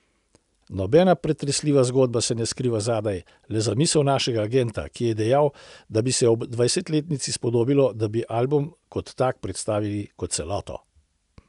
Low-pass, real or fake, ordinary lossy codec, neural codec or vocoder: 10.8 kHz; real; none; none